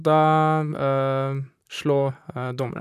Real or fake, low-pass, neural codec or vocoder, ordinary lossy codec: fake; 14.4 kHz; vocoder, 44.1 kHz, 128 mel bands, Pupu-Vocoder; none